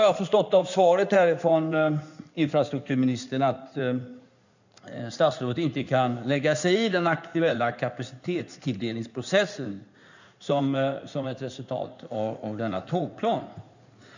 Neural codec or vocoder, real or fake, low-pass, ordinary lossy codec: codec, 16 kHz in and 24 kHz out, 2.2 kbps, FireRedTTS-2 codec; fake; 7.2 kHz; none